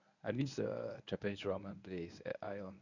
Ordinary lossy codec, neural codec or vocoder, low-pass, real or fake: none; codec, 24 kHz, 0.9 kbps, WavTokenizer, medium speech release version 1; 7.2 kHz; fake